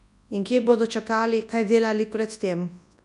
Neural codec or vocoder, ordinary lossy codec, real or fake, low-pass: codec, 24 kHz, 0.9 kbps, WavTokenizer, large speech release; none; fake; 10.8 kHz